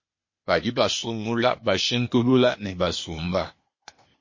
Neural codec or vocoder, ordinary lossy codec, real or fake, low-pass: codec, 16 kHz, 0.8 kbps, ZipCodec; MP3, 32 kbps; fake; 7.2 kHz